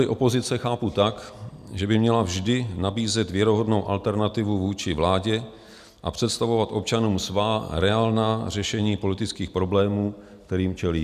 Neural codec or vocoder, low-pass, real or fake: none; 14.4 kHz; real